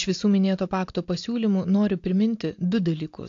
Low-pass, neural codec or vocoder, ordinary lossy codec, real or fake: 7.2 kHz; none; AAC, 48 kbps; real